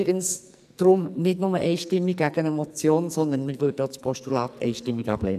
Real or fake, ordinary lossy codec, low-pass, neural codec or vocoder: fake; none; 14.4 kHz; codec, 44.1 kHz, 2.6 kbps, SNAC